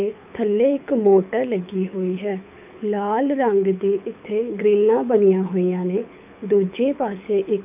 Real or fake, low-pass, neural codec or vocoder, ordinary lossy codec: fake; 3.6 kHz; codec, 24 kHz, 6 kbps, HILCodec; none